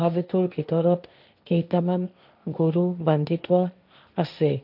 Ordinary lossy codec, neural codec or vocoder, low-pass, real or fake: none; codec, 16 kHz, 1.1 kbps, Voila-Tokenizer; 5.4 kHz; fake